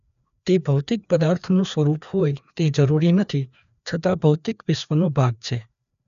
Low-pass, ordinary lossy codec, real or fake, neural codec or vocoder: 7.2 kHz; none; fake; codec, 16 kHz, 2 kbps, FreqCodec, larger model